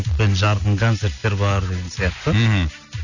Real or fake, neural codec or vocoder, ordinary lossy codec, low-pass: real; none; none; 7.2 kHz